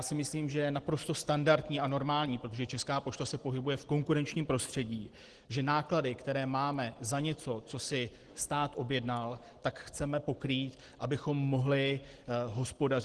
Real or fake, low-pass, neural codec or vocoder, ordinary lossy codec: real; 10.8 kHz; none; Opus, 16 kbps